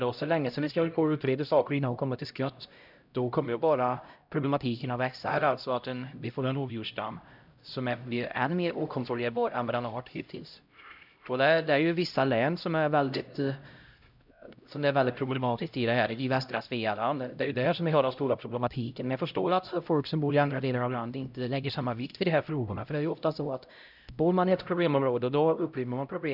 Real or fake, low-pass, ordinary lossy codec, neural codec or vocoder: fake; 5.4 kHz; none; codec, 16 kHz, 0.5 kbps, X-Codec, HuBERT features, trained on LibriSpeech